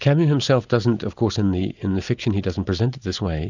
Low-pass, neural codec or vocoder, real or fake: 7.2 kHz; none; real